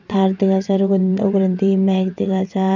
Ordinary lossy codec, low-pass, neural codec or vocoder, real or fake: none; 7.2 kHz; vocoder, 22.05 kHz, 80 mel bands, WaveNeXt; fake